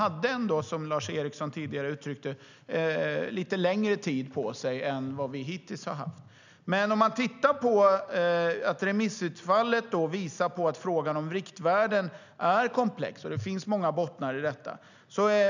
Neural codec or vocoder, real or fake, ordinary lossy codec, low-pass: none; real; none; 7.2 kHz